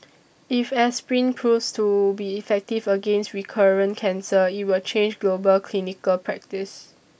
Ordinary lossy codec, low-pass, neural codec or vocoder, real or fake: none; none; none; real